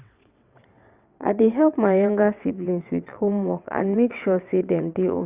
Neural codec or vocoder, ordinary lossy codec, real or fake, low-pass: vocoder, 22.05 kHz, 80 mel bands, WaveNeXt; Opus, 64 kbps; fake; 3.6 kHz